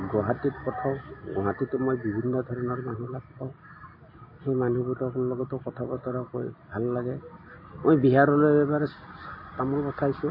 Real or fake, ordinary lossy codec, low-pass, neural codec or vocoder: real; MP3, 24 kbps; 5.4 kHz; none